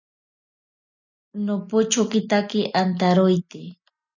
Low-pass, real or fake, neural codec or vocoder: 7.2 kHz; real; none